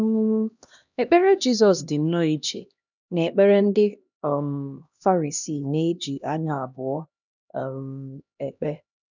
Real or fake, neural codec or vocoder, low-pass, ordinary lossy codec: fake; codec, 16 kHz, 1 kbps, X-Codec, HuBERT features, trained on LibriSpeech; 7.2 kHz; none